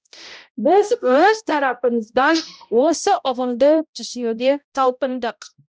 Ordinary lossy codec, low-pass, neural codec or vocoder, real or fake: none; none; codec, 16 kHz, 0.5 kbps, X-Codec, HuBERT features, trained on balanced general audio; fake